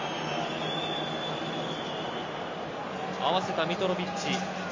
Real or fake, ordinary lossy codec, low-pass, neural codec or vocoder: real; MP3, 48 kbps; 7.2 kHz; none